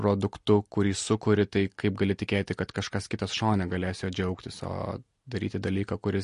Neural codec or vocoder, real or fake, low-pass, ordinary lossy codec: none; real; 10.8 kHz; MP3, 48 kbps